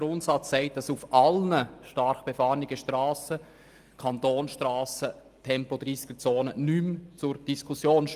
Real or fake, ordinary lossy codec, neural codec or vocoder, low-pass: real; Opus, 32 kbps; none; 14.4 kHz